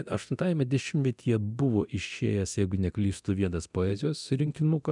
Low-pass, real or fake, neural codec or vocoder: 10.8 kHz; fake; codec, 24 kHz, 0.9 kbps, DualCodec